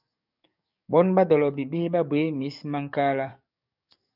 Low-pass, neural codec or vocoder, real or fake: 5.4 kHz; codec, 44.1 kHz, 7.8 kbps, DAC; fake